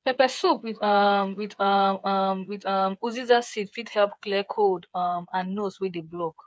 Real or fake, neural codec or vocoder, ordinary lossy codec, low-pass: fake; codec, 16 kHz, 8 kbps, FreqCodec, smaller model; none; none